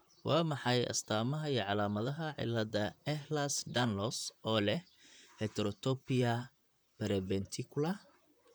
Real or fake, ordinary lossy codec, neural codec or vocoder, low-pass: fake; none; vocoder, 44.1 kHz, 128 mel bands, Pupu-Vocoder; none